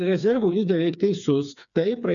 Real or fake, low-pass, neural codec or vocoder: fake; 7.2 kHz; codec, 16 kHz, 4 kbps, FreqCodec, smaller model